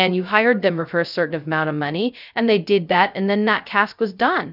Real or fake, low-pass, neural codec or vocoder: fake; 5.4 kHz; codec, 16 kHz, 0.2 kbps, FocalCodec